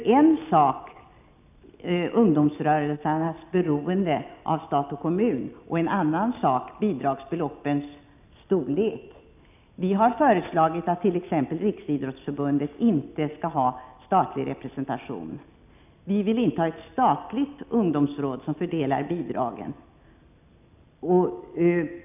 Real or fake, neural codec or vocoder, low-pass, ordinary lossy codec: real; none; 3.6 kHz; none